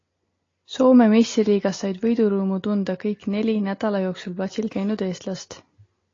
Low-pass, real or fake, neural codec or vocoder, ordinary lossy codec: 7.2 kHz; real; none; AAC, 32 kbps